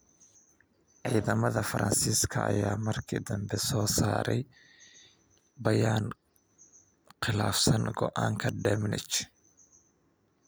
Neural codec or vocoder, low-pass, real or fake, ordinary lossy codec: none; none; real; none